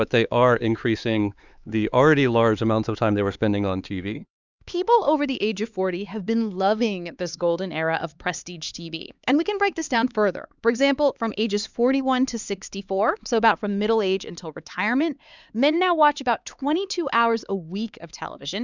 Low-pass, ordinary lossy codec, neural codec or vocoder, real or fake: 7.2 kHz; Opus, 64 kbps; codec, 16 kHz, 4 kbps, X-Codec, HuBERT features, trained on LibriSpeech; fake